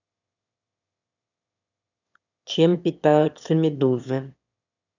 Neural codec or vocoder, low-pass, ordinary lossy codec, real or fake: autoencoder, 22.05 kHz, a latent of 192 numbers a frame, VITS, trained on one speaker; 7.2 kHz; none; fake